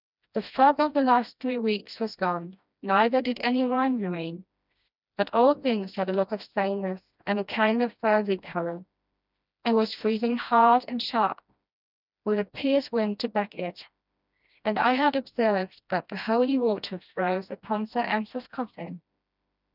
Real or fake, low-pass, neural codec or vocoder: fake; 5.4 kHz; codec, 16 kHz, 1 kbps, FreqCodec, smaller model